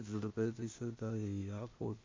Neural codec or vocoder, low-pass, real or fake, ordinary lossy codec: codec, 16 kHz, 0.8 kbps, ZipCodec; 7.2 kHz; fake; MP3, 32 kbps